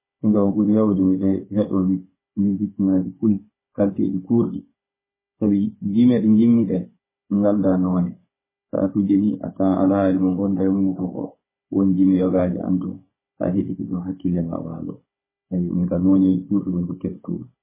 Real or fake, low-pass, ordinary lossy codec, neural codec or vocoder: fake; 3.6 kHz; MP3, 16 kbps; codec, 16 kHz, 16 kbps, FunCodec, trained on Chinese and English, 50 frames a second